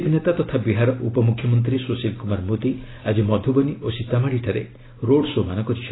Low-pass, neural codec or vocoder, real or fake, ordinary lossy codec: 7.2 kHz; none; real; AAC, 16 kbps